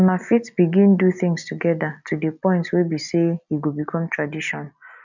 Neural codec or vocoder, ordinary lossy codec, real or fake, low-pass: none; none; real; 7.2 kHz